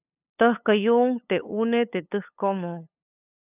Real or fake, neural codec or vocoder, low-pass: fake; codec, 16 kHz, 8 kbps, FunCodec, trained on LibriTTS, 25 frames a second; 3.6 kHz